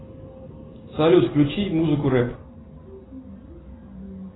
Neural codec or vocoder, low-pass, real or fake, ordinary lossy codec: none; 7.2 kHz; real; AAC, 16 kbps